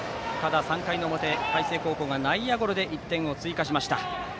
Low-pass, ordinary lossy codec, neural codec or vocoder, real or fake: none; none; none; real